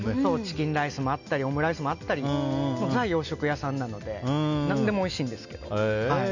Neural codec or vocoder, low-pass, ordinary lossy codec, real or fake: none; 7.2 kHz; none; real